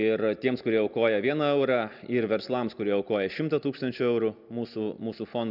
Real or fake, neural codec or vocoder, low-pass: real; none; 5.4 kHz